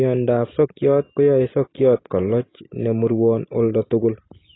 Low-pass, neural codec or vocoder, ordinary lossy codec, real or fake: 7.2 kHz; none; AAC, 16 kbps; real